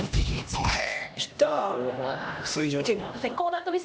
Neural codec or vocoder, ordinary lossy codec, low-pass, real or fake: codec, 16 kHz, 1 kbps, X-Codec, HuBERT features, trained on LibriSpeech; none; none; fake